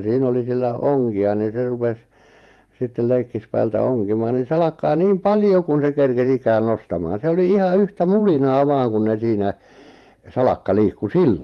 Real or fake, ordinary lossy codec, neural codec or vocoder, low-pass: fake; Opus, 32 kbps; vocoder, 48 kHz, 128 mel bands, Vocos; 19.8 kHz